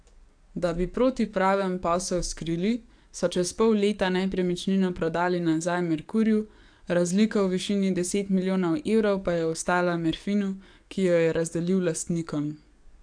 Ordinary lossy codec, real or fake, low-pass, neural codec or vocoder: AAC, 64 kbps; fake; 9.9 kHz; codec, 44.1 kHz, 7.8 kbps, DAC